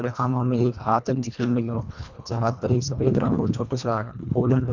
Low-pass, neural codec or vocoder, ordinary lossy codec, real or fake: 7.2 kHz; codec, 24 kHz, 1.5 kbps, HILCodec; none; fake